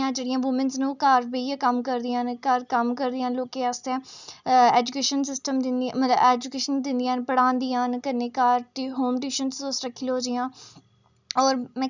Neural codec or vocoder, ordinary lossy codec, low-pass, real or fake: none; none; 7.2 kHz; real